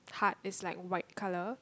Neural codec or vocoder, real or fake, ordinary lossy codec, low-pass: none; real; none; none